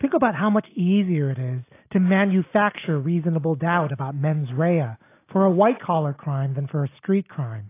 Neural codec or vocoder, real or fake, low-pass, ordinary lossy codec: none; real; 3.6 kHz; AAC, 24 kbps